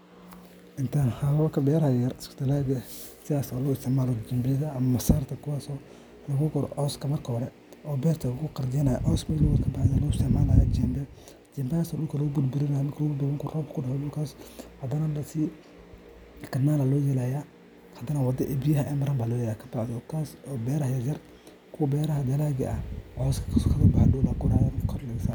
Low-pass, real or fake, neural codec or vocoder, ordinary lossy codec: none; real; none; none